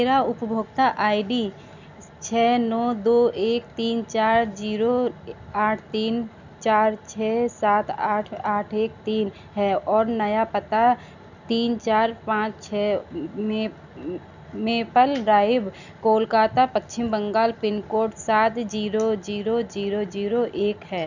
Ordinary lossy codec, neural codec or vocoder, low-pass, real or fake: none; none; 7.2 kHz; real